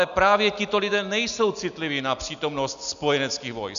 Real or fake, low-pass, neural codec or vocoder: real; 7.2 kHz; none